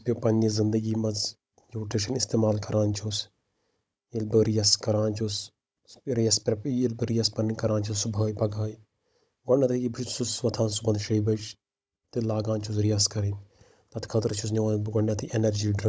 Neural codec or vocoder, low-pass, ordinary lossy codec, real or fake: codec, 16 kHz, 16 kbps, FunCodec, trained on Chinese and English, 50 frames a second; none; none; fake